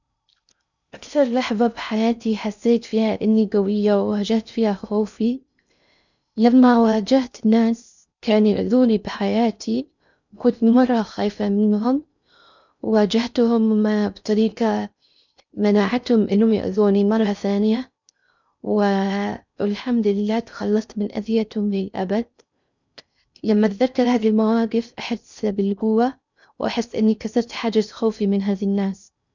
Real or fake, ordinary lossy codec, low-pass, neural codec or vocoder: fake; none; 7.2 kHz; codec, 16 kHz in and 24 kHz out, 0.6 kbps, FocalCodec, streaming, 4096 codes